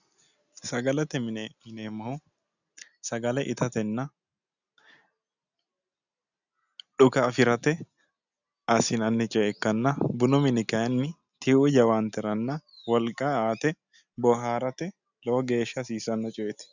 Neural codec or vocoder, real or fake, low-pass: none; real; 7.2 kHz